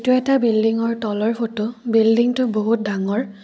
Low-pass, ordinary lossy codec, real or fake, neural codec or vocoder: none; none; real; none